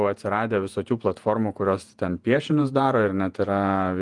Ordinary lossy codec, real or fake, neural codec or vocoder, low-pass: Opus, 32 kbps; fake; vocoder, 48 kHz, 128 mel bands, Vocos; 10.8 kHz